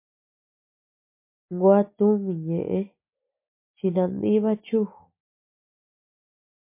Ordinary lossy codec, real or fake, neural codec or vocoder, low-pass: MP3, 24 kbps; real; none; 3.6 kHz